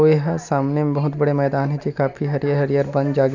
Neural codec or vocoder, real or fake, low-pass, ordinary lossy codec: none; real; 7.2 kHz; none